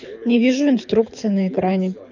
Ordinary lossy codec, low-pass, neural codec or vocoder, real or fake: none; 7.2 kHz; codec, 24 kHz, 6 kbps, HILCodec; fake